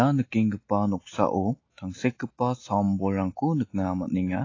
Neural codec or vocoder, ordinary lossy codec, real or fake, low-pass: none; AAC, 32 kbps; real; 7.2 kHz